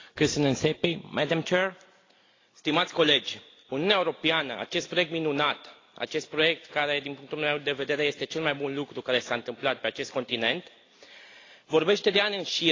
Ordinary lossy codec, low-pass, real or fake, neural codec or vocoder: AAC, 32 kbps; 7.2 kHz; real; none